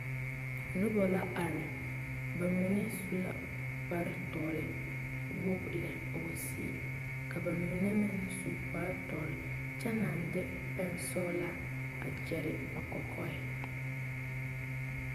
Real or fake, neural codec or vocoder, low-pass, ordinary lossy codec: fake; vocoder, 44.1 kHz, 128 mel bands, Pupu-Vocoder; 14.4 kHz; MP3, 96 kbps